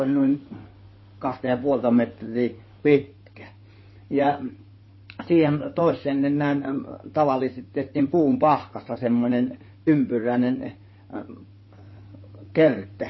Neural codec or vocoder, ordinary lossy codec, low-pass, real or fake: codec, 16 kHz in and 24 kHz out, 2.2 kbps, FireRedTTS-2 codec; MP3, 24 kbps; 7.2 kHz; fake